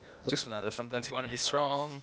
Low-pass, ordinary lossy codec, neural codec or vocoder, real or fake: none; none; codec, 16 kHz, 0.8 kbps, ZipCodec; fake